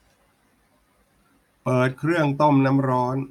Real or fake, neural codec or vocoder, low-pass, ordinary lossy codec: real; none; 19.8 kHz; none